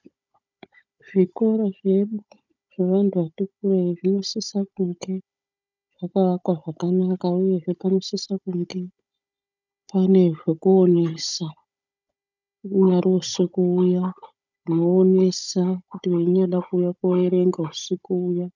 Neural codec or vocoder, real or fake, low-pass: codec, 16 kHz, 16 kbps, FunCodec, trained on Chinese and English, 50 frames a second; fake; 7.2 kHz